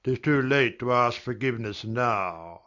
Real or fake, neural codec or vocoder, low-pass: real; none; 7.2 kHz